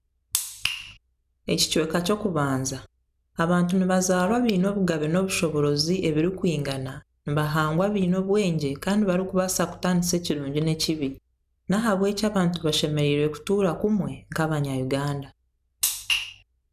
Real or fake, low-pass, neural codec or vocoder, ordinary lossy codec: real; 14.4 kHz; none; none